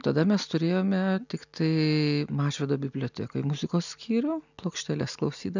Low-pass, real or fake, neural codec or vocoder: 7.2 kHz; real; none